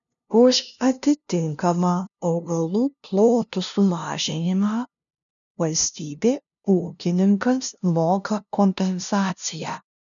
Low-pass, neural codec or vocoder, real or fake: 7.2 kHz; codec, 16 kHz, 0.5 kbps, FunCodec, trained on LibriTTS, 25 frames a second; fake